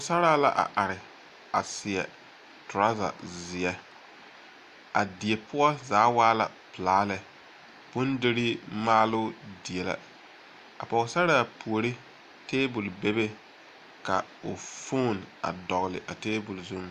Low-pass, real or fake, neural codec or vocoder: 14.4 kHz; real; none